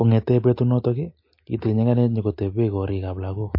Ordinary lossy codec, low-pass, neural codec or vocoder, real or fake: MP3, 32 kbps; 5.4 kHz; none; real